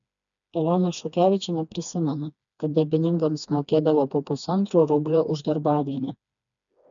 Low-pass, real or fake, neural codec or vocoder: 7.2 kHz; fake; codec, 16 kHz, 2 kbps, FreqCodec, smaller model